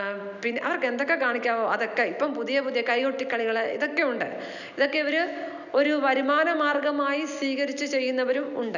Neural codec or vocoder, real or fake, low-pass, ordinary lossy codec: none; real; 7.2 kHz; none